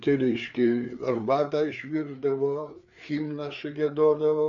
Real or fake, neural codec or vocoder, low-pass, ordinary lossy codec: fake; codec, 16 kHz, 4 kbps, FreqCodec, larger model; 7.2 kHz; MP3, 96 kbps